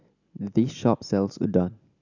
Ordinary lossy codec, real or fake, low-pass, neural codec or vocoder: none; real; 7.2 kHz; none